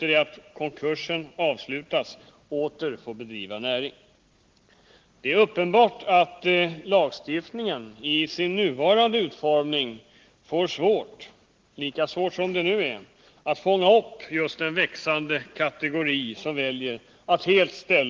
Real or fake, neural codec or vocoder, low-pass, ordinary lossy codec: real; none; 7.2 kHz; Opus, 16 kbps